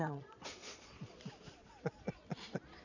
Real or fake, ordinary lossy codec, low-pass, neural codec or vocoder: fake; none; 7.2 kHz; vocoder, 22.05 kHz, 80 mel bands, Vocos